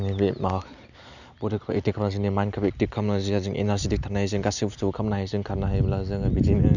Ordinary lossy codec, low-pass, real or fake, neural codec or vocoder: none; 7.2 kHz; real; none